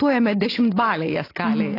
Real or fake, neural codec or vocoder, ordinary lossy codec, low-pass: real; none; AAC, 32 kbps; 5.4 kHz